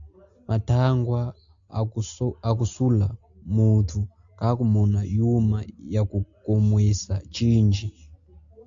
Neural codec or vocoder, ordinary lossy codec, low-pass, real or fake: none; AAC, 64 kbps; 7.2 kHz; real